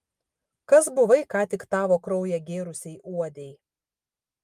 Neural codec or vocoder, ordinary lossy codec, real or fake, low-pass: none; Opus, 32 kbps; real; 14.4 kHz